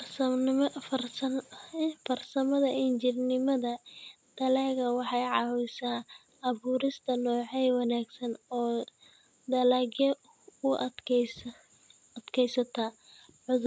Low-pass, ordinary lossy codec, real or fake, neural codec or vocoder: none; none; real; none